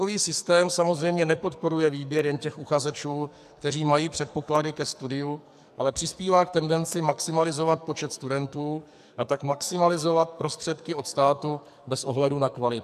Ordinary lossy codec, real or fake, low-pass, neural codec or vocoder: AAC, 96 kbps; fake; 14.4 kHz; codec, 44.1 kHz, 2.6 kbps, SNAC